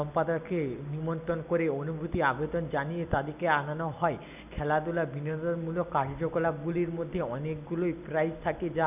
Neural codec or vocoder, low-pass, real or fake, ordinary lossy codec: none; 3.6 kHz; real; none